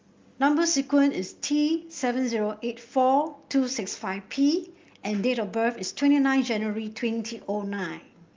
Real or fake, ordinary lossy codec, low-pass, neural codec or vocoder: real; Opus, 32 kbps; 7.2 kHz; none